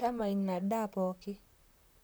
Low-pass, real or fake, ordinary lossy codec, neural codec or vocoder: none; fake; none; vocoder, 44.1 kHz, 128 mel bands, Pupu-Vocoder